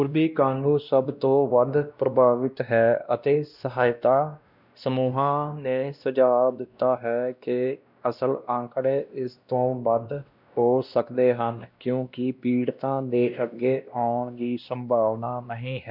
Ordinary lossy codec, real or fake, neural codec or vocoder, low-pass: none; fake; codec, 16 kHz, 1 kbps, X-Codec, WavLM features, trained on Multilingual LibriSpeech; 5.4 kHz